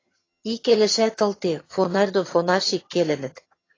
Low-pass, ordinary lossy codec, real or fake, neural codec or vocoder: 7.2 kHz; AAC, 32 kbps; fake; vocoder, 22.05 kHz, 80 mel bands, HiFi-GAN